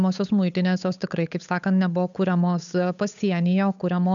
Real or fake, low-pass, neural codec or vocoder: fake; 7.2 kHz; codec, 16 kHz, 8 kbps, FunCodec, trained on Chinese and English, 25 frames a second